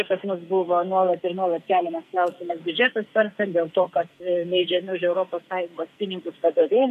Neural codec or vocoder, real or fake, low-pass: codec, 44.1 kHz, 2.6 kbps, SNAC; fake; 14.4 kHz